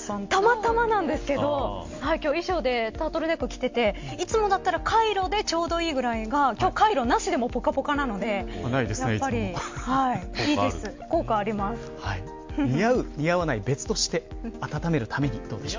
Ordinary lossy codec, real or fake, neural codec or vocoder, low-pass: MP3, 48 kbps; real; none; 7.2 kHz